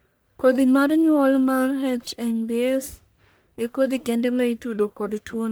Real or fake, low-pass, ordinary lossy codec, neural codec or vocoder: fake; none; none; codec, 44.1 kHz, 1.7 kbps, Pupu-Codec